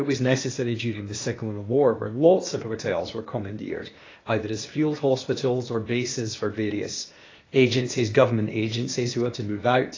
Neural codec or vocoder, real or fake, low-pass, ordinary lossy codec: codec, 16 kHz, 0.8 kbps, ZipCodec; fake; 7.2 kHz; AAC, 32 kbps